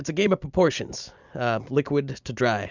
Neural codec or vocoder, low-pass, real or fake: none; 7.2 kHz; real